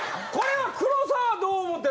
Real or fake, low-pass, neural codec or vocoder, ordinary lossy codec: real; none; none; none